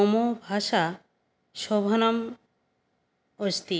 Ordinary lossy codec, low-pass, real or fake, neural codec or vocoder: none; none; real; none